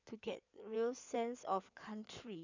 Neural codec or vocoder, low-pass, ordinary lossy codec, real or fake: codec, 16 kHz in and 24 kHz out, 2.2 kbps, FireRedTTS-2 codec; 7.2 kHz; none; fake